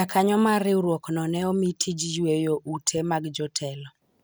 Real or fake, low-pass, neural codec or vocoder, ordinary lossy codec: real; none; none; none